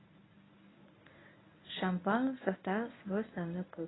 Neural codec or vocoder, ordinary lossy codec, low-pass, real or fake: codec, 24 kHz, 0.9 kbps, WavTokenizer, medium speech release version 1; AAC, 16 kbps; 7.2 kHz; fake